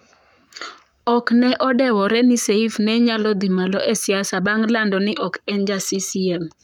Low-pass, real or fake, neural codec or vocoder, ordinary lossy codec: 19.8 kHz; fake; codec, 44.1 kHz, 7.8 kbps, Pupu-Codec; none